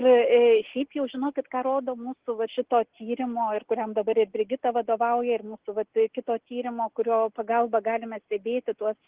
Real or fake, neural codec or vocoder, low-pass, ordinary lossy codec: real; none; 3.6 kHz; Opus, 16 kbps